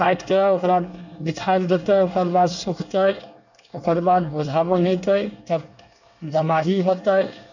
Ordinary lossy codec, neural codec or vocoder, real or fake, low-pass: none; codec, 24 kHz, 1 kbps, SNAC; fake; 7.2 kHz